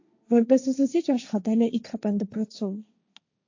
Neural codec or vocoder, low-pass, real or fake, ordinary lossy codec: codec, 16 kHz, 1.1 kbps, Voila-Tokenizer; 7.2 kHz; fake; AAC, 48 kbps